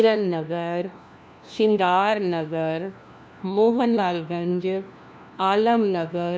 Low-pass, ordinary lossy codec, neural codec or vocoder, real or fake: none; none; codec, 16 kHz, 1 kbps, FunCodec, trained on LibriTTS, 50 frames a second; fake